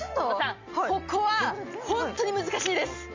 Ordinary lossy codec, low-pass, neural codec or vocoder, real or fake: MP3, 64 kbps; 7.2 kHz; none; real